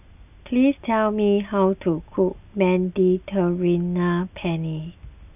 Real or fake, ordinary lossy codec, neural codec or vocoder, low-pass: real; none; none; 3.6 kHz